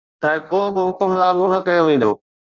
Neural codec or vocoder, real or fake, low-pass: codec, 16 kHz in and 24 kHz out, 0.6 kbps, FireRedTTS-2 codec; fake; 7.2 kHz